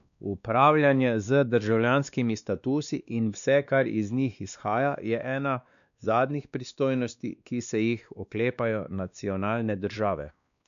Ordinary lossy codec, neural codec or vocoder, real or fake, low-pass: none; codec, 16 kHz, 2 kbps, X-Codec, WavLM features, trained on Multilingual LibriSpeech; fake; 7.2 kHz